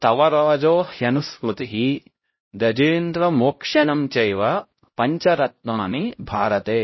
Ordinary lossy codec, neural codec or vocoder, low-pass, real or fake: MP3, 24 kbps; codec, 16 kHz, 0.5 kbps, X-Codec, HuBERT features, trained on LibriSpeech; 7.2 kHz; fake